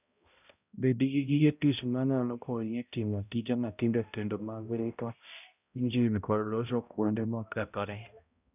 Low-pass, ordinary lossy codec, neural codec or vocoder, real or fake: 3.6 kHz; none; codec, 16 kHz, 0.5 kbps, X-Codec, HuBERT features, trained on balanced general audio; fake